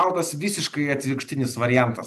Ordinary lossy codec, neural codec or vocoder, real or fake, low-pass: AAC, 96 kbps; none; real; 14.4 kHz